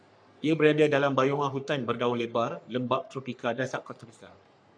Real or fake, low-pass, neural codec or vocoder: fake; 9.9 kHz; codec, 44.1 kHz, 3.4 kbps, Pupu-Codec